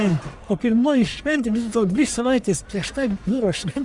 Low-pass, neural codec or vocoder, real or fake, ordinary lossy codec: 10.8 kHz; codec, 44.1 kHz, 1.7 kbps, Pupu-Codec; fake; Opus, 64 kbps